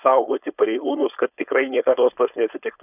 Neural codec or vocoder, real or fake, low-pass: codec, 16 kHz, 4.8 kbps, FACodec; fake; 3.6 kHz